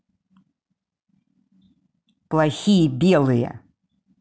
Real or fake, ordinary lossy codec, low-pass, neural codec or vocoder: real; none; none; none